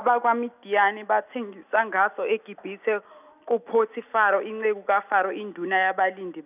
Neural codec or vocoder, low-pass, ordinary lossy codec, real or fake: none; 3.6 kHz; none; real